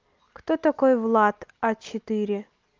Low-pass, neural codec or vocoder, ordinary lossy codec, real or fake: 7.2 kHz; none; Opus, 24 kbps; real